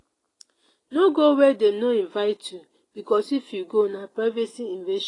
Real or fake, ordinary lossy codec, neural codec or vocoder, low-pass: real; AAC, 32 kbps; none; 10.8 kHz